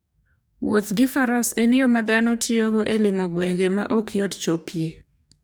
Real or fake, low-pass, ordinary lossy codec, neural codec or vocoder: fake; none; none; codec, 44.1 kHz, 2.6 kbps, DAC